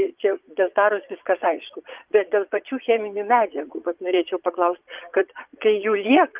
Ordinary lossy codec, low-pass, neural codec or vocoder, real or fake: Opus, 24 kbps; 3.6 kHz; vocoder, 22.05 kHz, 80 mel bands, Vocos; fake